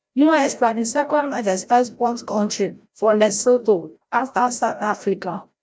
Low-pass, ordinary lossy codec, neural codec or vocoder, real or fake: none; none; codec, 16 kHz, 0.5 kbps, FreqCodec, larger model; fake